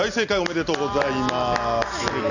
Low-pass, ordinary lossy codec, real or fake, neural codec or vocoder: 7.2 kHz; none; real; none